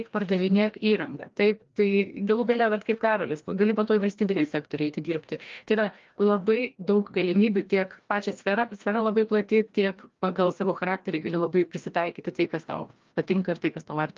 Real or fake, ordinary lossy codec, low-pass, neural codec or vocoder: fake; Opus, 32 kbps; 7.2 kHz; codec, 16 kHz, 1 kbps, FreqCodec, larger model